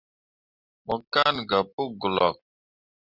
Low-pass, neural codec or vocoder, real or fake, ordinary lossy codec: 5.4 kHz; none; real; Opus, 64 kbps